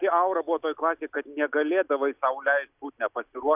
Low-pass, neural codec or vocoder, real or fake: 3.6 kHz; none; real